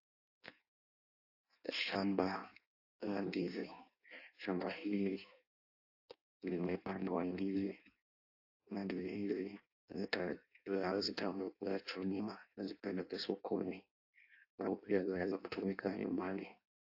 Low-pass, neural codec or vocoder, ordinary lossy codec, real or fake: 5.4 kHz; codec, 16 kHz in and 24 kHz out, 0.6 kbps, FireRedTTS-2 codec; AAC, 32 kbps; fake